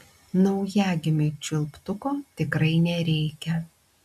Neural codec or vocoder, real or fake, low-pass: none; real; 14.4 kHz